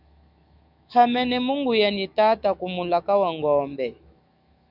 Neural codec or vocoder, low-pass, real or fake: autoencoder, 48 kHz, 128 numbers a frame, DAC-VAE, trained on Japanese speech; 5.4 kHz; fake